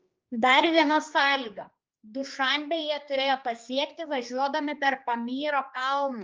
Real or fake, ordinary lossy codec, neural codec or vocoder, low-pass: fake; Opus, 24 kbps; codec, 16 kHz, 2 kbps, X-Codec, HuBERT features, trained on general audio; 7.2 kHz